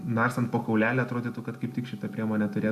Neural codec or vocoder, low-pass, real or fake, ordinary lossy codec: none; 14.4 kHz; real; MP3, 96 kbps